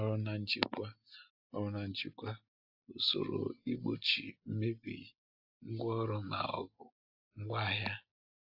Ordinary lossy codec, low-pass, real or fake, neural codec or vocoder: AAC, 48 kbps; 5.4 kHz; real; none